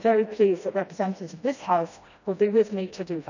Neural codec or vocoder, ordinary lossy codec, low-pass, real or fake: codec, 16 kHz, 1 kbps, FreqCodec, smaller model; none; 7.2 kHz; fake